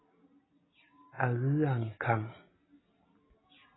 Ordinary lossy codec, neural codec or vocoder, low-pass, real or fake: AAC, 16 kbps; none; 7.2 kHz; real